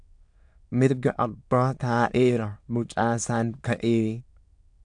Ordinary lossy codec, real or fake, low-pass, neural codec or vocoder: AAC, 64 kbps; fake; 9.9 kHz; autoencoder, 22.05 kHz, a latent of 192 numbers a frame, VITS, trained on many speakers